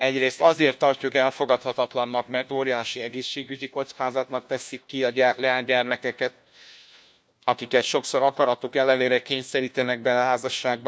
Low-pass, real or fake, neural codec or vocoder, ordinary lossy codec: none; fake; codec, 16 kHz, 1 kbps, FunCodec, trained on LibriTTS, 50 frames a second; none